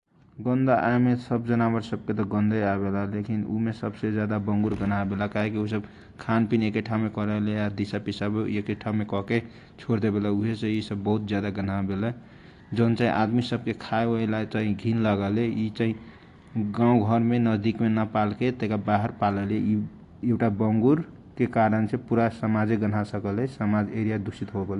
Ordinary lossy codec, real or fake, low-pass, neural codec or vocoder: AAC, 48 kbps; real; 10.8 kHz; none